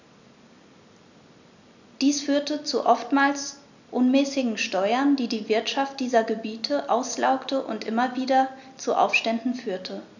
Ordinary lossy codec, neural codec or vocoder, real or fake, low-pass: none; none; real; 7.2 kHz